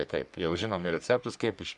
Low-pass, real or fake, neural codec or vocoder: 10.8 kHz; fake; codec, 44.1 kHz, 3.4 kbps, Pupu-Codec